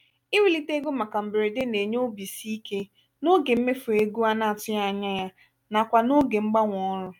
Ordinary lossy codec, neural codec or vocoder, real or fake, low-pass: MP3, 96 kbps; none; real; 19.8 kHz